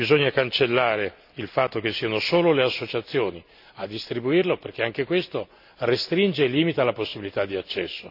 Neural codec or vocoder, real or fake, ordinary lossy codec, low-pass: none; real; none; 5.4 kHz